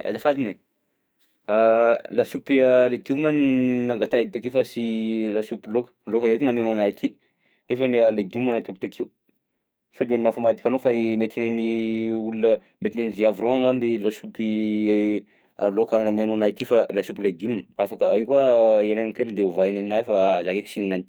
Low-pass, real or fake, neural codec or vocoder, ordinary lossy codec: none; fake; codec, 44.1 kHz, 2.6 kbps, SNAC; none